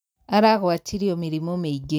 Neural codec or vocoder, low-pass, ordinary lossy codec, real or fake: none; none; none; real